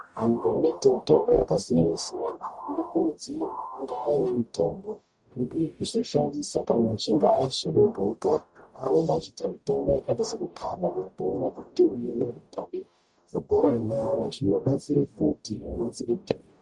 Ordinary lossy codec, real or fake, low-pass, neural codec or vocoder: Opus, 64 kbps; fake; 10.8 kHz; codec, 44.1 kHz, 0.9 kbps, DAC